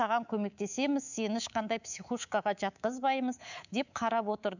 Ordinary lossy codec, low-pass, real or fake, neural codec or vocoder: none; 7.2 kHz; fake; codec, 24 kHz, 3.1 kbps, DualCodec